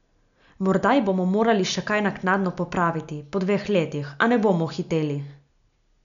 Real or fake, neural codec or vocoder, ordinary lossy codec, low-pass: real; none; none; 7.2 kHz